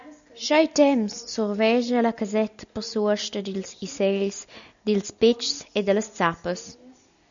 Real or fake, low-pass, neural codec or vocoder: real; 7.2 kHz; none